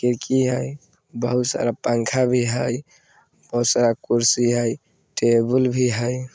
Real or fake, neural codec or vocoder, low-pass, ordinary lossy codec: real; none; none; none